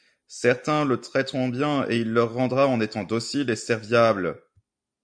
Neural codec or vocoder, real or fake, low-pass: none; real; 9.9 kHz